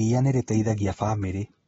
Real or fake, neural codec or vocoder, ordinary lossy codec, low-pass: real; none; AAC, 24 kbps; 19.8 kHz